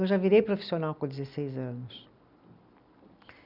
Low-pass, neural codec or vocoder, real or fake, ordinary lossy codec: 5.4 kHz; none; real; Opus, 64 kbps